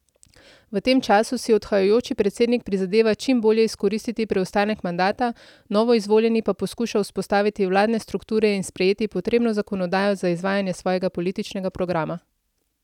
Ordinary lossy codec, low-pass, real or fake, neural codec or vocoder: none; 19.8 kHz; real; none